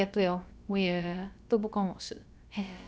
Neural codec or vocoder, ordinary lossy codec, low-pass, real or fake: codec, 16 kHz, about 1 kbps, DyCAST, with the encoder's durations; none; none; fake